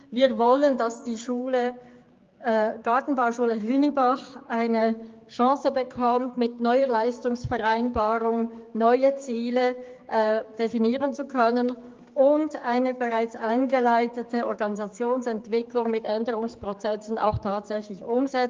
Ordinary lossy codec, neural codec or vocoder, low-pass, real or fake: Opus, 32 kbps; codec, 16 kHz, 4 kbps, X-Codec, HuBERT features, trained on general audio; 7.2 kHz; fake